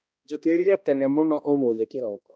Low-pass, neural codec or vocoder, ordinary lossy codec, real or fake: none; codec, 16 kHz, 1 kbps, X-Codec, HuBERT features, trained on balanced general audio; none; fake